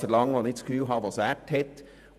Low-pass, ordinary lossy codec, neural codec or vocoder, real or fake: 14.4 kHz; none; vocoder, 48 kHz, 128 mel bands, Vocos; fake